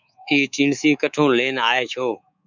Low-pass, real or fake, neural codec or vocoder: 7.2 kHz; fake; codec, 24 kHz, 3.1 kbps, DualCodec